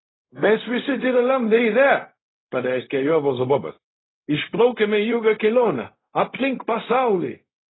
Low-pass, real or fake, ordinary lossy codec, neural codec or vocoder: 7.2 kHz; fake; AAC, 16 kbps; codec, 16 kHz, 0.4 kbps, LongCat-Audio-Codec